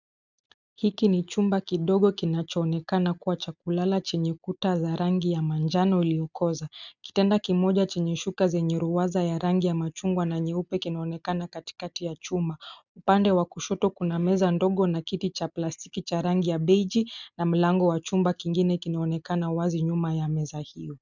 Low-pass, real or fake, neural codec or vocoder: 7.2 kHz; real; none